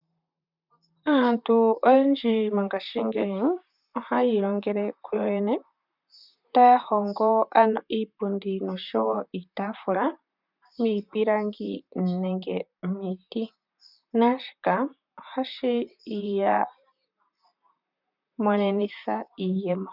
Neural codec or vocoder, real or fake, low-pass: vocoder, 44.1 kHz, 128 mel bands, Pupu-Vocoder; fake; 5.4 kHz